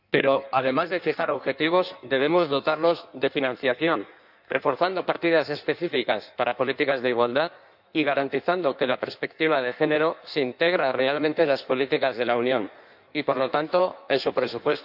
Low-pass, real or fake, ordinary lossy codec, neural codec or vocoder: 5.4 kHz; fake; none; codec, 16 kHz in and 24 kHz out, 1.1 kbps, FireRedTTS-2 codec